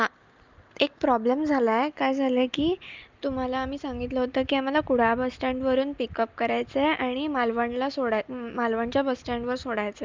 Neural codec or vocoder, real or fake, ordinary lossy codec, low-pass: none; real; Opus, 24 kbps; 7.2 kHz